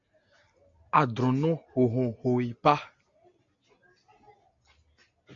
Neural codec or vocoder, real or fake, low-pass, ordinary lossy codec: none; real; 7.2 kHz; AAC, 48 kbps